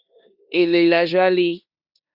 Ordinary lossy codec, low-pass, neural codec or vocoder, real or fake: Opus, 64 kbps; 5.4 kHz; codec, 16 kHz in and 24 kHz out, 0.9 kbps, LongCat-Audio-Codec, four codebook decoder; fake